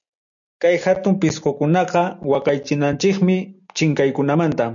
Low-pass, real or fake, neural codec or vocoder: 7.2 kHz; real; none